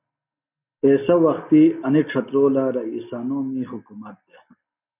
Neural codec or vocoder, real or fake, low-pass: none; real; 3.6 kHz